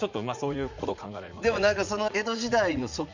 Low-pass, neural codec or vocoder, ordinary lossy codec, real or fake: 7.2 kHz; vocoder, 44.1 kHz, 80 mel bands, Vocos; Opus, 64 kbps; fake